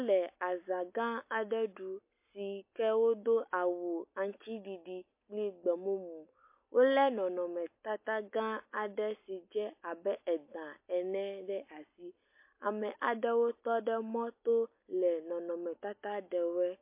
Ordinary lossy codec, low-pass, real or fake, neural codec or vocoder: MP3, 24 kbps; 3.6 kHz; real; none